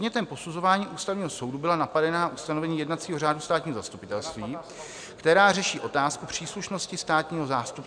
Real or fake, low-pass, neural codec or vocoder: real; 9.9 kHz; none